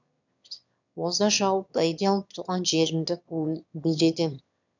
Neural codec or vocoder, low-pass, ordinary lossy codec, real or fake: autoencoder, 22.05 kHz, a latent of 192 numbers a frame, VITS, trained on one speaker; 7.2 kHz; none; fake